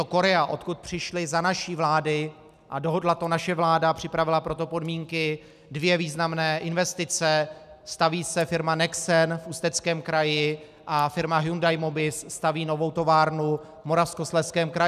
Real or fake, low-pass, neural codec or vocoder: real; 14.4 kHz; none